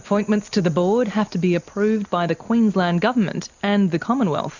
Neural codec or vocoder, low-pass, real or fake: none; 7.2 kHz; real